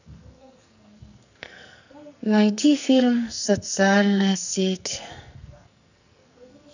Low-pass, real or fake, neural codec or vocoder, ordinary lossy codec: 7.2 kHz; fake; codec, 44.1 kHz, 2.6 kbps, SNAC; none